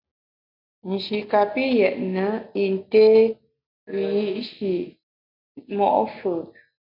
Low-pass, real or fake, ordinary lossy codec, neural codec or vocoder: 5.4 kHz; real; MP3, 48 kbps; none